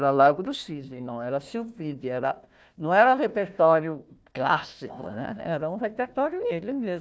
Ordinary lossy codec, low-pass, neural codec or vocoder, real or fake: none; none; codec, 16 kHz, 1 kbps, FunCodec, trained on Chinese and English, 50 frames a second; fake